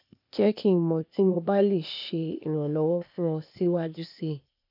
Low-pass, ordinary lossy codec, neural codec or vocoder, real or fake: 5.4 kHz; none; codec, 16 kHz, 0.8 kbps, ZipCodec; fake